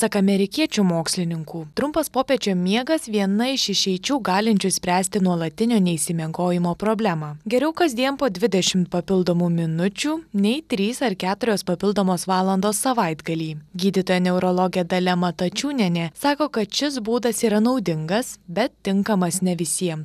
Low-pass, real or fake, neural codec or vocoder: 14.4 kHz; real; none